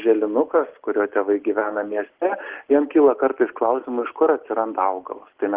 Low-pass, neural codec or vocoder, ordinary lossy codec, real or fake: 3.6 kHz; none; Opus, 16 kbps; real